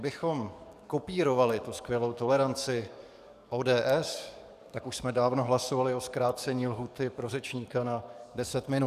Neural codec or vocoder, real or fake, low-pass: codec, 44.1 kHz, 7.8 kbps, DAC; fake; 14.4 kHz